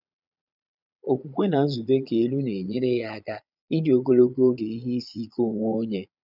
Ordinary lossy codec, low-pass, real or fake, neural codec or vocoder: none; 5.4 kHz; fake; vocoder, 22.05 kHz, 80 mel bands, Vocos